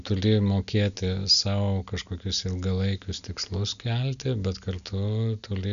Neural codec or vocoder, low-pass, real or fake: none; 7.2 kHz; real